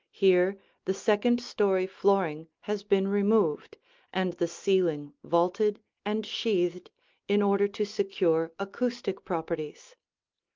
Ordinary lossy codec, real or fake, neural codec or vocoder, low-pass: Opus, 32 kbps; real; none; 7.2 kHz